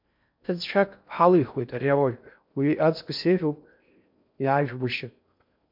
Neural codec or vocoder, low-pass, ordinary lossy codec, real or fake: codec, 16 kHz in and 24 kHz out, 0.6 kbps, FocalCodec, streaming, 4096 codes; 5.4 kHz; MP3, 48 kbps; fake